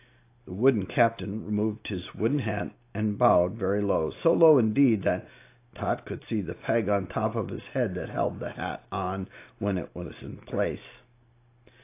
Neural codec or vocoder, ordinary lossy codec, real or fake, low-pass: none; AAC, 24 kbps; real; 3.6 kHz